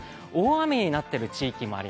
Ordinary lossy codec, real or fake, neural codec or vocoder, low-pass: none; real; none; none